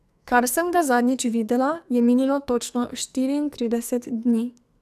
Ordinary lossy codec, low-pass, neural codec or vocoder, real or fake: none; 14.4 kHz; codec, 44.1 kHz, 2.6 kbps, SNAC; fake